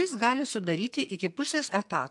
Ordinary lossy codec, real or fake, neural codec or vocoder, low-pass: MP3, 64 kbps; fake; codec, 44.1 kHz, 2.6 kbps, SNAC; 10.8 kHz